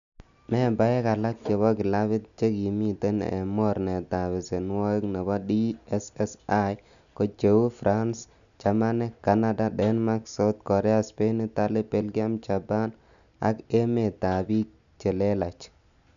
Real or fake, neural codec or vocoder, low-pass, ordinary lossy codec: real; none; 7.2 kHz; none